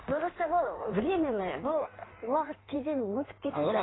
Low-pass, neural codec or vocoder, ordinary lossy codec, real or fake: 7.2 kHz; codec, 16 kHz in and 24 kHz out, 1.1 kbps, FireRedTTS-2 codec; AAC, 16 kbps; fake